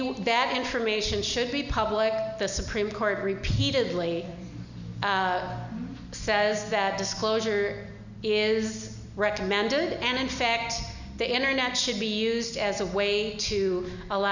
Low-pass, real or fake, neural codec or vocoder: 7.2 kHz; real; none